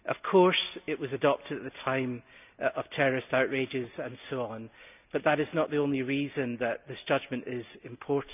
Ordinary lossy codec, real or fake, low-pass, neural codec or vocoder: none; real; 3.6 kHz; none